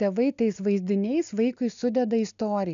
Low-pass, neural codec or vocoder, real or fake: 7.2 kHz; none; real